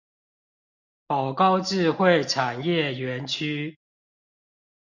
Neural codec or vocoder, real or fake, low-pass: none; real; 7.2 kHz